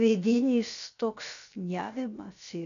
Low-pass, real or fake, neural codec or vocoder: 7.2 kHz; fake; codec, 16 kHz, about 1 kbps, DyCAST, with the encoder's durations